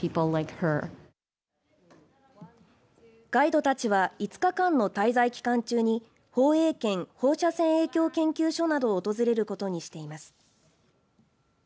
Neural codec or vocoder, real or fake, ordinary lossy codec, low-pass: none; real; none; none